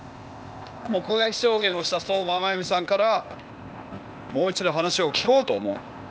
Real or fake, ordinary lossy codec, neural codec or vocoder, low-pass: fake; none; codec, 16 kHz, 0.8 kbps, ZipCodec; none